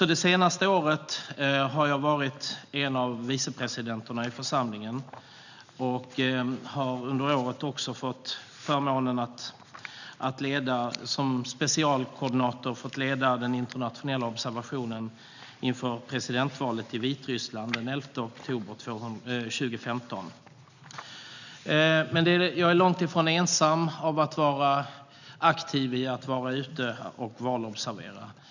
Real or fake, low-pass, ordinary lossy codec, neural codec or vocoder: real; 7.2 kHz; none; none